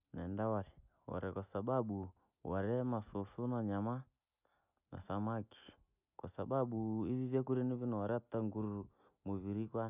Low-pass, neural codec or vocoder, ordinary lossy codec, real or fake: 3.6 kHz; none; none; real